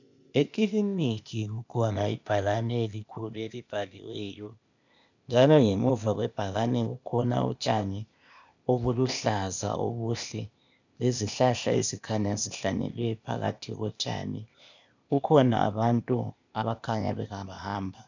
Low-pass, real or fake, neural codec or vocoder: 7.2 kHz; fake; codec, 16 kHz, 0.8 kbps, ZipCodec